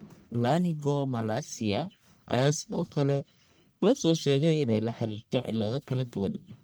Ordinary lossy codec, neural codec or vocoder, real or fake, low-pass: none; codec, 44.1 kHz, 1.7 kbps, Pupu-Codec; fake; none